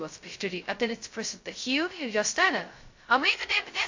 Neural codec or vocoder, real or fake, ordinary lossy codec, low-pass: codec, 16 kHz, 0.2 kbps, FocalCodec; fake; AAC, 48 kbps; 7.2 kHz